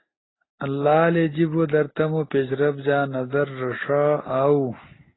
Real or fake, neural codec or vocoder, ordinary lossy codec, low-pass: real; none; AAC, 16 kbps; 7.2 kHz